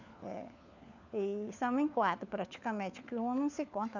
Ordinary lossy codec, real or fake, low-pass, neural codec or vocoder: none; fake; 7.2 kHz; codec, 16 kHz, 4 kbps, FunCodec, trained on LibriTTS, 50 frames a second